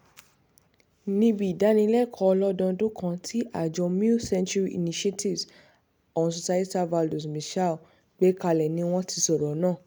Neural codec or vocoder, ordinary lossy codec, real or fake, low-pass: none; none; real; none